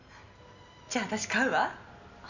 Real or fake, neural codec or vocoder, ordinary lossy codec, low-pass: real; none; none; 7.2 kHz